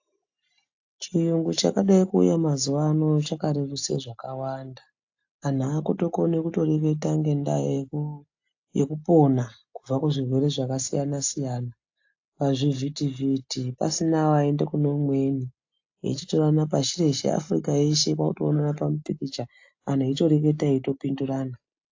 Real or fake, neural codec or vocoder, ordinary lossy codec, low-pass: real; none; AAC, 48 kbps; 7.2 kHz